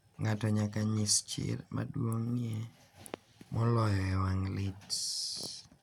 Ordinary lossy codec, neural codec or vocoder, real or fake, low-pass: none; none; real; 19.8 kHz